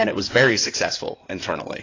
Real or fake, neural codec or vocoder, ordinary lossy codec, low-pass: fake; codec, 16 kHz in and 24 kHz out, 2.2 kbps, FireRedTTS-2 codec; AAC, 32 kbps; 7.2 kHz